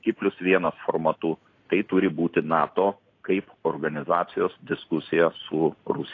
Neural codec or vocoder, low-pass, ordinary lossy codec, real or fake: none; 7.2 kHz; AAC, 32 kbps; real